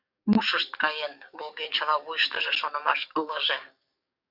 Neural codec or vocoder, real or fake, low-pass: codec, 44.1 kHz, 7.8 kbps, DAC; fake; 5.4 kHz